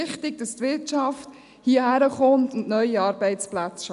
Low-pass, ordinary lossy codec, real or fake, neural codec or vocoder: 10.8 kHz; none; real; none